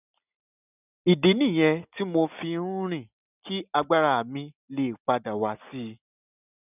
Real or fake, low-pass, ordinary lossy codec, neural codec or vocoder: real; 3.6 kHz; none; none